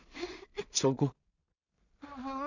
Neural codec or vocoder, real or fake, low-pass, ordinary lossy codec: codec, 16 kHz in and 24 kHz out, 0.4 kbps, LongCat-Audio-Codec, two codebook decoder; fake; 7.2 kHz; none